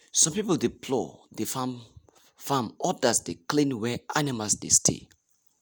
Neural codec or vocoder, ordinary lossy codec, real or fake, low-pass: none; none; real; none